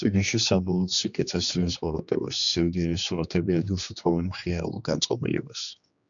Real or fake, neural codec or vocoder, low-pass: fake; codec, 16 kHz, 2 kbps, X-Codec, HuBERT features, trained on general audio; 7.2 kHz